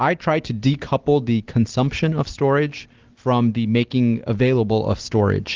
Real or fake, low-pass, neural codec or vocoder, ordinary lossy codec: real; 7.2 kHz; none; Opus, 16 kbps